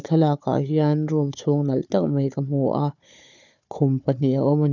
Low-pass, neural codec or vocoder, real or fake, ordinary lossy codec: 7.2 kHz; codec, 16 kHz, 4 kbps, FunCodec, trained on Chinese and English, 50 frames a second; fake; none